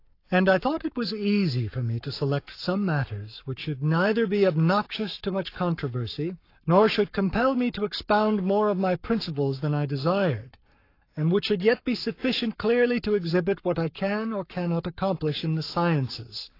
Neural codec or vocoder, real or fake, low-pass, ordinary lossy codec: codec, 44.1 kHz, 7.8 kbps, Pupu-Codec; fake; 5.4 kHz; AAC, 32 kbps